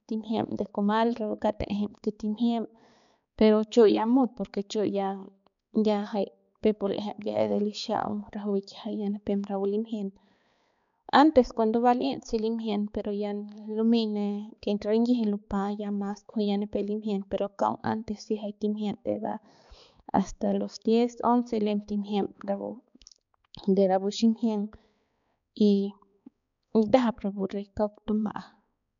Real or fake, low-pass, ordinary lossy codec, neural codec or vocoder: fake; 7.2 kHz; none; codec, 16 kHz, 4 kbps, X-Codec, HuBERT features, trained on balanced general audio